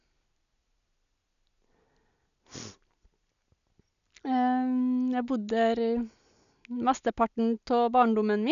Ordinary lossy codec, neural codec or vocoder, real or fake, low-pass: none; none; real; 7.2 kHz